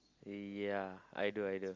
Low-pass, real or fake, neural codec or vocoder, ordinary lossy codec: 7.2 kHz; real; none; Opus, 32 kbps